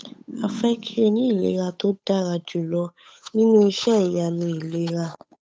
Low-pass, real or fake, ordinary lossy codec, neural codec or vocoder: none; fake; none; codec, 16 kHz, 8 kbps, FunCodec, trained on Chinese and English, 25 frames a second